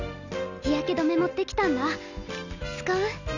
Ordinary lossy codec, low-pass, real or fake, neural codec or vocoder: none; 7.2 kHz; real; none